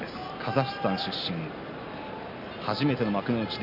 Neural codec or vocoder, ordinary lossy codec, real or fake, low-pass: none; none; real; 5.4 kHz